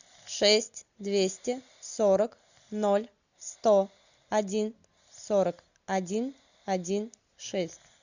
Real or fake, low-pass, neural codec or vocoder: real; 7.2 kHz; none